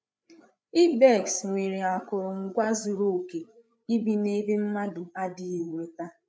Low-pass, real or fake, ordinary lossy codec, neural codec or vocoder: none; fake; none; codec, 16 kHz, 8 kbps, FreqCodec, larger model